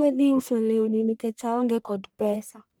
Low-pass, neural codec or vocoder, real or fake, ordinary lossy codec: none; codec, 44.1 kHz, 1.7 kbps, Pupu-Codec; fake; none